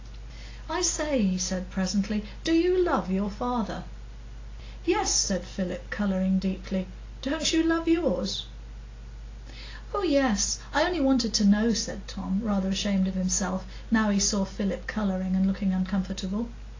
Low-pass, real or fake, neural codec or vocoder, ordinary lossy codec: 7.2 kHz; real; none; AAC, 32 kbps